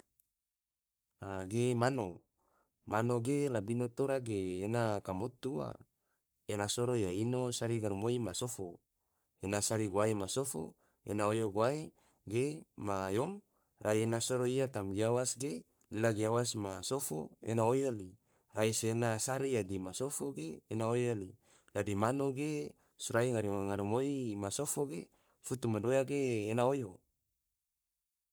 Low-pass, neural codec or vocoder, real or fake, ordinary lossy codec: none; codec, 44.1 kHz, 3.4 kbps, Pupu-Codec; fake; none